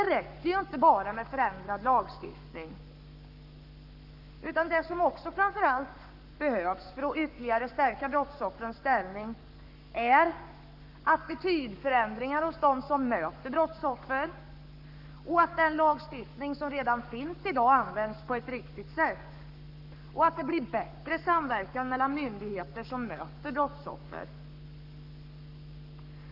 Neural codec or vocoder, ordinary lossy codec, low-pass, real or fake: codec, 44.1 kHz, 7.8 kbps, Pupu-Codec; none; 5.4 kHz; fake